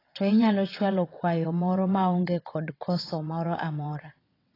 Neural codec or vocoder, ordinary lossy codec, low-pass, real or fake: vocoder, 22.05 kHz, 80 mel bands, WaveNeXt; AAC, 24 kbps; 5.4 kHz; fake